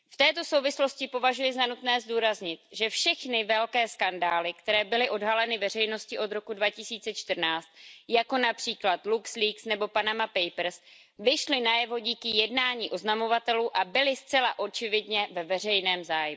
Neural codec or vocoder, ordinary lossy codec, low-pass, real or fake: none; none; none; real